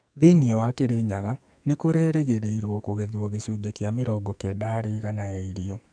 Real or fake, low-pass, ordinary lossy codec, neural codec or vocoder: fake; 9.9 kHz; MP3, 96 kbps; codec, 44.1 kHz, 2.6 kbps, SNAC